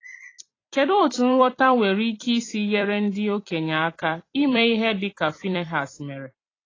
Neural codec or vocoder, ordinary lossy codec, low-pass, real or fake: vocoder, 44.1 kHz, 128 mel bands every 256 samples, BigVGAN v2; AAC, 32 kbps; 7.2 kHz; fake